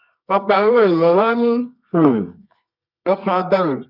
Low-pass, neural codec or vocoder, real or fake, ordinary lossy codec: 5.4 kHz; codec, 24 kHz, 0.9 kbps, WavTokenizer, medium music audio release; fake; none